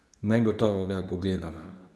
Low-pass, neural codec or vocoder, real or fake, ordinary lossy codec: none; codec, 24 kHz, 0.9 kbps, WavTokenizer, medium speech release version 2; fake; none